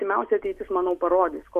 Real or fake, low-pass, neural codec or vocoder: real; 14.4 kHz; none